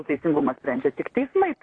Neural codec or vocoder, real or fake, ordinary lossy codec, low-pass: vocoder, 44.1 kHz, 128 mel bands, Pupu-Vocoder; fake; AAC, 32 kbps; 9.9 kHz